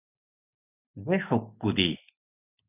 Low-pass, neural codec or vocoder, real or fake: 3.6 kHz; none; real